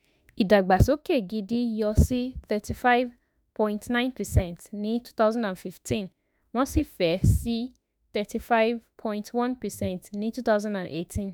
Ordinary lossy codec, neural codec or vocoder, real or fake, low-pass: none; autoencoder, 48 kHz, 32 numbers a frame, DAC-VAE, trained on Japanese speech; fake; none